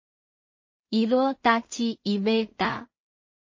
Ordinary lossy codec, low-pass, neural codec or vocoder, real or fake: MP3, 32 kbps; 7.2 kHz; codec, 16 kHz in and 24 kHz out, 0.4 kbps, LongCat-Audio-Codec, two codebook decoder; fake